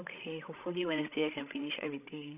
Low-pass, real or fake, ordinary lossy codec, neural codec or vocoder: 3.6 kHz; fake; none; codec, 16 kHz, 16 kbps, FreqCodec, larger model